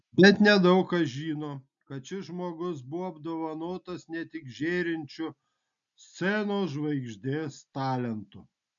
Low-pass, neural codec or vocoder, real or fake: 7.2 kHz; none; real